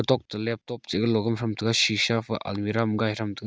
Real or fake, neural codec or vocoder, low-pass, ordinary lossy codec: real; none; none; none